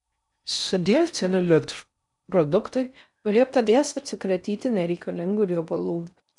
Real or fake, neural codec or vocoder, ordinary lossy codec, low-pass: fake; codec, 16 kHz in and 24 kHz out, 0.6 kbps, FocalCodec, streaming, 4096 codes; MP3, 96 kbps; 10.8 kHz